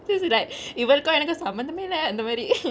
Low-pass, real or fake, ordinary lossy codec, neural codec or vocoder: none; real; none; none